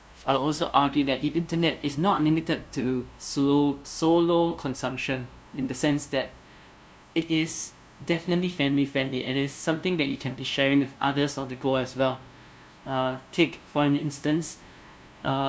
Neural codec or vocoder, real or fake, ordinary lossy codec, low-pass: codec, 16 kHz, 0.5 kbps, FunCodec, trained on LibriTTS, 25 frames a second; fake; none; none